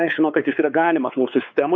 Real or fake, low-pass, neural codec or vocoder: fake; 7.2 kHz; codec, 16 kHz, 4 kbps, X-Codec, WavLM features, trained on Multilingual LibriSpeech